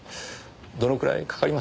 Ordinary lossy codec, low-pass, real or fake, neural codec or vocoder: none; none; real; none